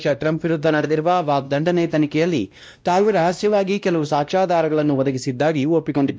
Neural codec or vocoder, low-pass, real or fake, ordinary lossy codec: codec, 16 kHz, 1 kbps, X-Codec, WavLM features, trained on Multilingual LibriSpeech; none; fake; none